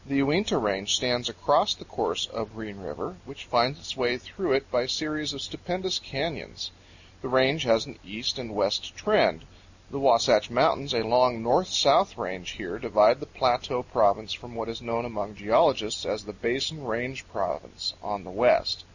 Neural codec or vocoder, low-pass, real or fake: none; 7.2 kHz; real